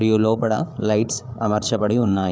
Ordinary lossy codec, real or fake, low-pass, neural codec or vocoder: none; fake; none; codec, 16 kHz, 8 kbps, FreqCodec, larger model